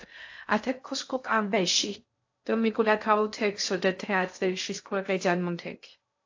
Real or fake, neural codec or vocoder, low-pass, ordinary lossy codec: fake; codec, 16 kHz in and 24 kHz out, 0.6 kbps, FocalCodec, streaming, 2048 codes; 7.2 kHz; AAC, 48 kbps